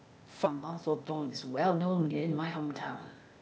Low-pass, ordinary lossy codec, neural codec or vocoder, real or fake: none; none; codec, 16 kHz, 0.8 kbps, ZipCodec; fake